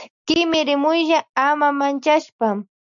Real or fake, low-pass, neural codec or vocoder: real; 7.2 kHz; none